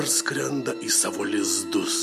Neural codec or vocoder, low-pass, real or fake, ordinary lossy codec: none; 14.4 kHz; real; MP3, 64 kbps